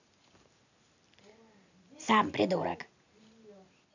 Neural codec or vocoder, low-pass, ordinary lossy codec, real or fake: none; 7.2 kHz; none; real